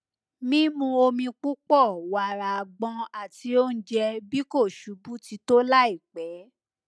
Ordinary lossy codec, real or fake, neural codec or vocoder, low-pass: none; real; none; none